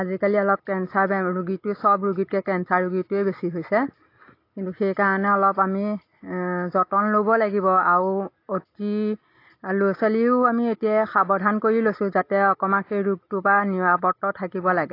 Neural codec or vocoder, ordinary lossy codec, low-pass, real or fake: none; AAC, 32 kbps; 5.4 kHz; real